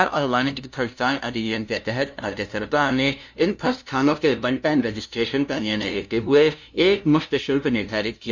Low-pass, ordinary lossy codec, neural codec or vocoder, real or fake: none; none; codec, 16 kHz, 0.5 kbps, FunCodec, trained on LibriTTS, 25 frames a second; fake